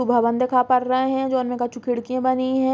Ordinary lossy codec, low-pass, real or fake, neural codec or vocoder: none; none; real; none